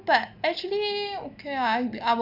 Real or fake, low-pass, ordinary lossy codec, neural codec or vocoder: real; 5.4 kHz; none; none